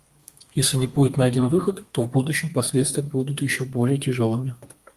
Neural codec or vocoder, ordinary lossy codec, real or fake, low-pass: codec, 32 kHz, 1.9 kbps, SNAC; Opus, 32 kbps; fake; 14.4 kHz